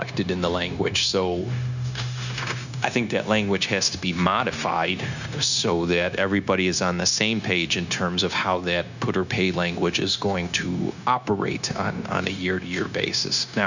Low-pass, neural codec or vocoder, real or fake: 7.2 kHz; codec, 16 kHz, 0.9 kbps, LongCat-Audio-Codec; fake